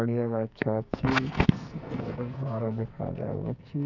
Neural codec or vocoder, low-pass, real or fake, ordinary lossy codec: codec, 44.1 kHz, 2.6 kbps, SNAC; 7.2 kHz; fake; none